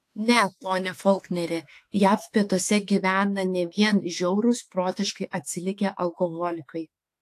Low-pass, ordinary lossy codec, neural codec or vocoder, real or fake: 14.4 kHz; AAC, 64 kbps; autoencoder, 48 kHz, 32 numbers a frame, DAC-VAE, trained on Japanese speech; fake